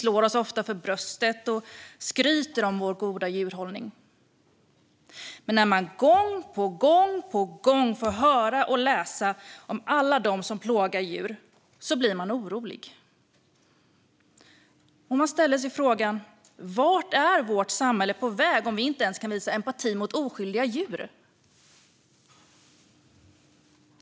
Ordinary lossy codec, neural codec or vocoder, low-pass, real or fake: none; none; none; real